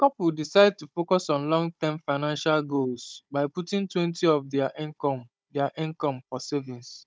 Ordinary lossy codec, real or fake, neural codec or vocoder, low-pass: none; fake; codec, 16 kHz, 16 kbps, FunCodec, trained on Chinese and English, 50 frames a second; none